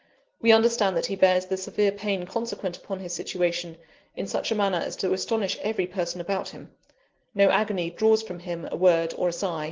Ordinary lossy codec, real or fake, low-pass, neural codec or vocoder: Opus, 16 kbps; real; 7.2 kHz; none